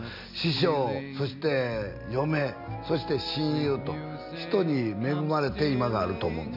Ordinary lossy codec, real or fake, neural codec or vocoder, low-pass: MP3, 48 kbps; real; none; 5.4 kHz